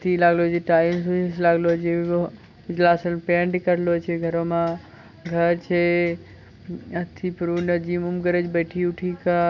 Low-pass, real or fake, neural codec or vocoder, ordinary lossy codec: 7.2 kHz; real; none; none